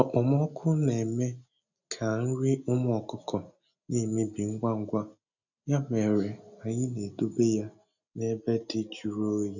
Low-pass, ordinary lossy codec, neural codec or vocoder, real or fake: 7.2 kHz; none; none; real